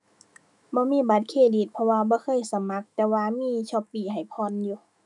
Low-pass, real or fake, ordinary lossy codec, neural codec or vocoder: 10.8 kHz; fake; none; autoencoder, 48 kHz, 128 numbers a frame, DAC-VAE, trained on Japanese speech